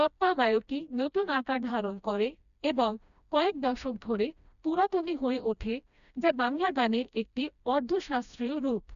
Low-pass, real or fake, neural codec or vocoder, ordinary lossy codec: 7.2 kHz; fake; codec, 16 kHz, 1 kbps, FreqCodec, smaller model; none